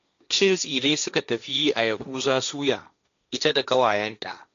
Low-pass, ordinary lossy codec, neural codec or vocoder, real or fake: 7.2 kHz; MP3, 64 kbps; codec, 16 kHz, 1.1 kbps, Voila-Tokenizer; fake